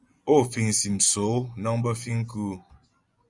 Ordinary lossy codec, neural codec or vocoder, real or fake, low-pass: Opus, 64 kbps; vocoder, 24 kHz, 100 mel bands, Vocos; fake; 10.8 kHz